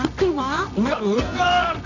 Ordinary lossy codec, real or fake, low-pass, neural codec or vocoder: none; fake; 7.2 kHz; codec, 24 kHz, 0.9 kbps, WavTokenizer, medium music audio release